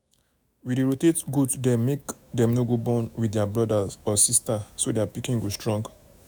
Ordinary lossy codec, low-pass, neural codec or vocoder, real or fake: none; none; autoencoder, 48 kHz, 128 numbers a frame, DAC-VAE, trained on Japanese speech; fake